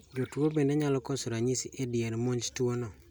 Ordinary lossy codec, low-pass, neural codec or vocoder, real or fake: none; none; none; real